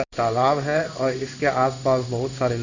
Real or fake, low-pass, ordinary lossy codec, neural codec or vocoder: fake; 7.2 kHz; none; codec, 16 kHz in and 24 kHz out, 1 kbps, XY-Tokenizer